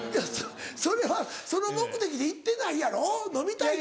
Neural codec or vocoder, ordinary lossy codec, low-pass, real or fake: none; none; none; real